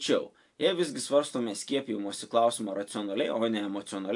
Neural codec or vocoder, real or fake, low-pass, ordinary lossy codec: none; real; 10.8 kHz; AAC, 64 kbps